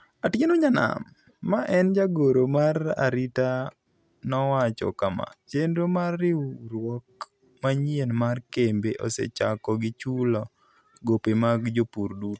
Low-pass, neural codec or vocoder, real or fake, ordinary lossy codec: none; none; real; none